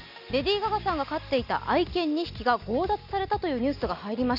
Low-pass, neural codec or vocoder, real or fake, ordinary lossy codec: 5.4 kHz; none; real; none